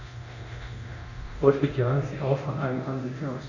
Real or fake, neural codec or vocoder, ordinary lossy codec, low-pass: fake; codec, 24 kHz, 0.9 kbps, DualCodec; none; 7.2 kHz